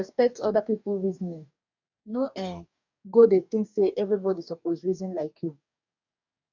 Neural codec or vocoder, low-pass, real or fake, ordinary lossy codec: codec, 44.1 kHz, 2.6 kbps, DAC; 7.2 kHz; fake; none